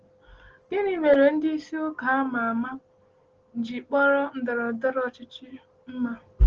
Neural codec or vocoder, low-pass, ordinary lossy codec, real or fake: none; 7.2 kHz; Opus, 16 kbps; real